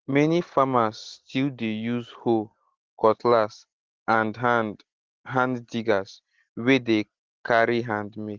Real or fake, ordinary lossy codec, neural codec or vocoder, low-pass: real; Opus, 16 kbps; none; 7.2 kHz